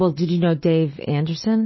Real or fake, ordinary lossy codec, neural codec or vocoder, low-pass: real; MP3, 24 kbps; none; 7.2 kHz